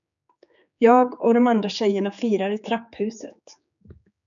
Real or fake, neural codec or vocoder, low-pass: fake; codec, 16 kHz, 4 kbps, X-Codec, HuBERT features, trained on general audio; 7.2 kHz